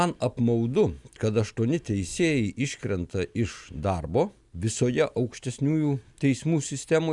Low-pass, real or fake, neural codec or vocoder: 10.8 kHz; real; none